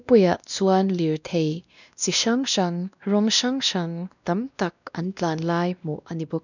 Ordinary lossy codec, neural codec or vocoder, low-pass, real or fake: none; codec, 16 kHz, 1 kbps, X-Codec, WavLM features, trained on Multilingual LibriSpeech; 7.2 kHz; fake